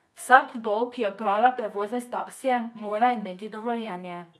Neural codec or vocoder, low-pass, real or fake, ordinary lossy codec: codec, 24 kHz, 0.9 kbps, WavTokenizer, medium music audio release; none; fake; none